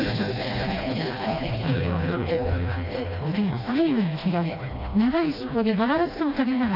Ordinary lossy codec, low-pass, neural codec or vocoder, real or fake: none; 5.4 kHz; codec, 16 kHz, 1 kbps, FreqCodec, smaller model; fake